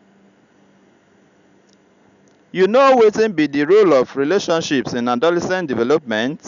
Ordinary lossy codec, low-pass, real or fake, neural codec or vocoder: none; 7.2 kHz; real; none